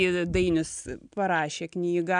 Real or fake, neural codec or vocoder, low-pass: real; none; 9.9 kHz